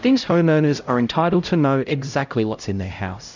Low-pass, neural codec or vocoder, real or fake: 7.2 kHz; codec, 16 kHz, 0.5 kbps, X-Codec, HuBERT features, trained on LibriSpeech; fake